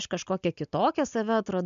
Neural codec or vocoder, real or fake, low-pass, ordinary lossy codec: none; real; 7.2 kHz; MP3, 64 kbps